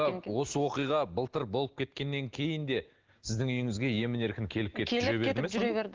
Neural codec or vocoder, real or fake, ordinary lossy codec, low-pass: none; real; Opus, 16 kbps; 7.2 kHz